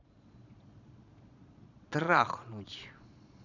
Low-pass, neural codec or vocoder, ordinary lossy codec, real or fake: 7.2 kHz; vocoder, 22.05 kHz, 80 mel bands, Vocos; none; fake